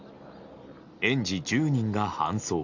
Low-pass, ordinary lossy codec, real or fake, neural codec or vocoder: 7.2 kHz; Opus, 64 kbps; real; none